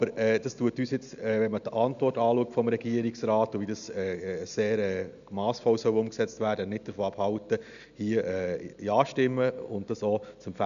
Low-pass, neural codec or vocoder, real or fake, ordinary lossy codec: 7.2 kHz; none; real; none